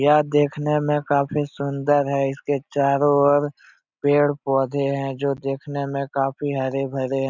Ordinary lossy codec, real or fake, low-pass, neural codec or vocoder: none; real; 7.2 kHz; none